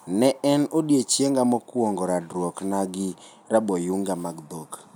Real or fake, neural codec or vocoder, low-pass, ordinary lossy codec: real; none; none; none